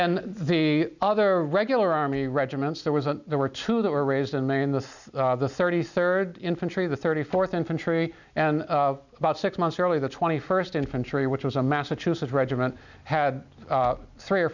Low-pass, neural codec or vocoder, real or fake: 7.2 kHz; none; real